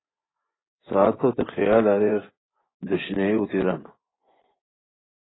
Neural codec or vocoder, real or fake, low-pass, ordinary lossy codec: vocoder, 24 kHz, 100 mel bands, Vocos; fake; 7.2 kHz; AAC, 16 kbps